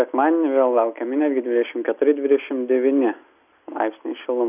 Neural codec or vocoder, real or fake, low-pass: none; real; 3.6 kHz